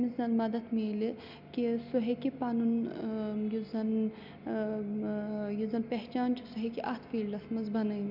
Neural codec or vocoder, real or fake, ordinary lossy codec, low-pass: none; real; MP3, 48 kbps; 5.4 kHz